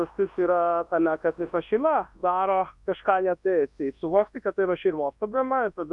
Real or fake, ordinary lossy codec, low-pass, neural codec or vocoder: fake; MP3, 64 kbps; 10.8 kHz; codec, 24 kHz, 0.9 kbps, WavTokenizer, large speech release